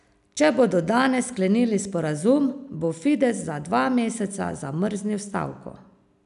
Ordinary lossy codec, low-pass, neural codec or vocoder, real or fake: none; 10.8 kHz; none; real